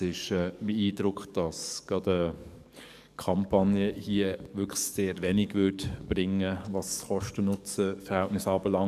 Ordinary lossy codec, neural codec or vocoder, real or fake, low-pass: none; codec, 44.1 kHz, 7.8 kbps, DAC; fake; 14.4 kHz